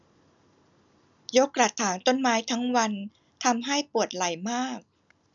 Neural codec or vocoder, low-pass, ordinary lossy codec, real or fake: none; 7.2 kHz; none; real